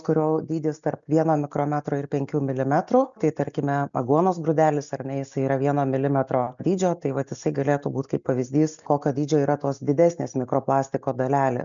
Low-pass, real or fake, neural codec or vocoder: 7.2 kHz; real; none